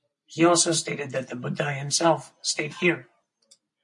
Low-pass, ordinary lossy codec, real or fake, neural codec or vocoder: 10.8 kHz; MP3, 64 kbps; real; none